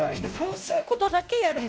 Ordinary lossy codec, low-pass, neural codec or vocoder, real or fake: none; none; codec, 16 kHz, 1 kbps, X-Codec, WavLM features, trained on Multilingual LibriSpeech; fake